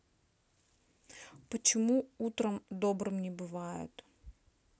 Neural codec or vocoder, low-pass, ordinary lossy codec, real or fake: none; none; none; real